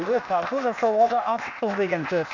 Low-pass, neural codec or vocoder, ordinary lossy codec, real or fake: 7.2 kHz; codec, 16 kHz, 0.8 kbps, ZipCodec; none; fake